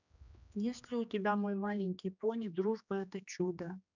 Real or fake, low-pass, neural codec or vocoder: fake; 7.2 kHz; codec, 16 kHz, 2 kbps, X-Codec, HuBERT features, trained on general audio